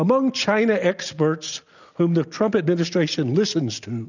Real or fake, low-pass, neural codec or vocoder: real; 7.2 kHz; none